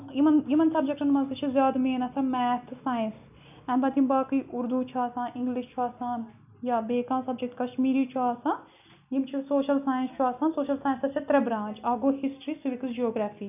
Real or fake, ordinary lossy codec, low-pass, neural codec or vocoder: real; none; 3.6 kHz; none